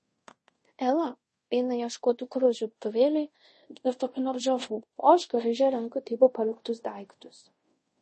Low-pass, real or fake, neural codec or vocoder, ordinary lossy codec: 10.8 kHz; fake; codec, 24 kHz, 0.5 kbps, DualCodec; MP3, 32 kbps